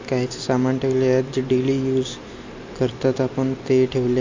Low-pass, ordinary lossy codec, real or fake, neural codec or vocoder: 7.2 kHz; MP3, 48 kbps; real; none